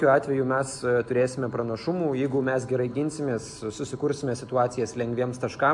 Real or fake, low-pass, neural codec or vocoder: real; 10.8 kHz; none